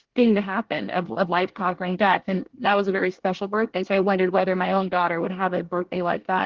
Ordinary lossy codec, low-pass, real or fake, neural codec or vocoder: Opus, 16 kbps; 7.2 kHz; fake; codec, 24 kHz, 1 kbps, SNAC